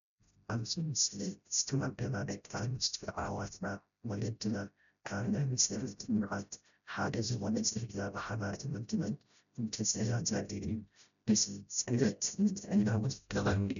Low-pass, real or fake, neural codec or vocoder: 7.2 kHz; fake; codec, 16 kHz, 0.5 kbps, FreqCodec, smaller model